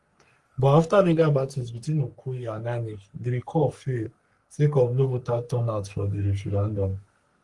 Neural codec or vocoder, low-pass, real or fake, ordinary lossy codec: codec, 44.1 kHz, 3.4 kbps, Pupu-Codec; 10.8 kHz; fake; Opus, 24 kbps